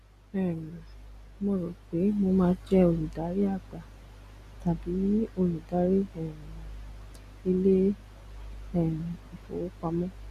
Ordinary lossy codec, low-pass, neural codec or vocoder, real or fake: Opus, 64 kbps; 14.4 kHz; none; real